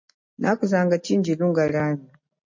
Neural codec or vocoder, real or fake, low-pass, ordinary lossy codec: none; real; 7.2 kHz; MP3, 48 kbps